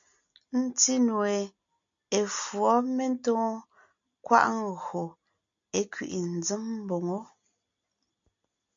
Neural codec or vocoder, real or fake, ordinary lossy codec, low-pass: none; real; MP3, 64 kbps; 7.2 kHz